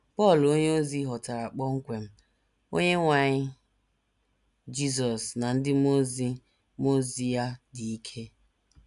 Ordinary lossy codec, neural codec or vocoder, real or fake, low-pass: none; none; real; 10.8 kHz